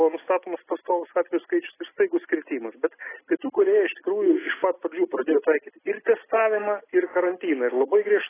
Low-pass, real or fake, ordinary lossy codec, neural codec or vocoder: 3.6 kHz; real; AAC, 16 kbps; none